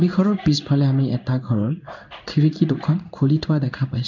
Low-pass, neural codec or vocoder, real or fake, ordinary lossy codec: 7.2 kHz; codec, 16 kHz in and 24 kHz out, 1 kbps, XY-Tokenizer; fake; none